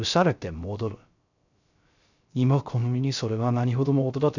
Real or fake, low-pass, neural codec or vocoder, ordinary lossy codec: fake; 7.2 kHz; codec, 16 kHz, 0.3 kbps, FocalCodec; none